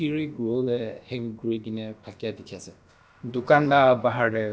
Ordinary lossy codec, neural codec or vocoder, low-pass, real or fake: none; codec, 16 kHz, about 1 kbps, DyCAST, with the encoder's durations; none; fake